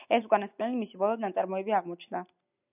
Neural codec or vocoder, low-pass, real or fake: none; 3.6 kHz; real